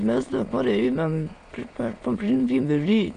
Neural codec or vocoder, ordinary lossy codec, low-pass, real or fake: autoencoder, 22.05 kHz, a latent of 192 numbers a frame, VITS, trained on many speakers; AAC, 48 kbps; 9.9 kHz; fake